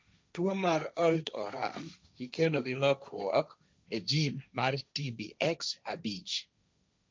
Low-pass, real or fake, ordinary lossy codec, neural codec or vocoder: none; fake; none; codec, 16 kHz, 1.1 kbps, Voila-Tokenizer